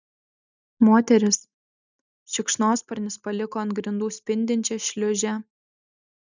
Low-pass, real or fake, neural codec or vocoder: 7.2 kHz; real; none